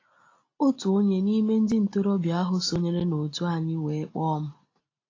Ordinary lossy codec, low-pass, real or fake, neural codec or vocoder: AAC, 32 kbps; 7.2 kHz; real; none